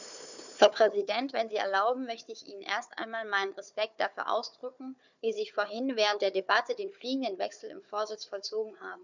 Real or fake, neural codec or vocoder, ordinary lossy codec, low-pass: fake; codec, 16 kHz, 4 kbps, FunCodec, trained on Chinese and English, 50 frames a second; none; 7.2 kHz